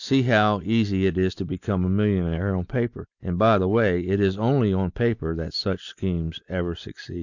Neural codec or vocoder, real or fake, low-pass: none; real; 7.2 kHz